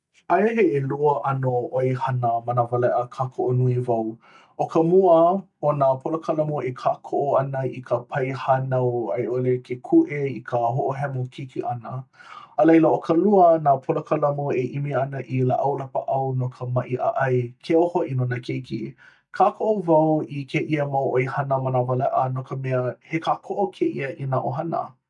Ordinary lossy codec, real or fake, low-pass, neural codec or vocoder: none; real; 10.8 kHz; none